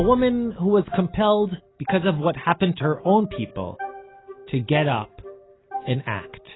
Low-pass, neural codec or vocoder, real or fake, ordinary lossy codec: 7.2 kHz; none; real; AAC, 16 kbps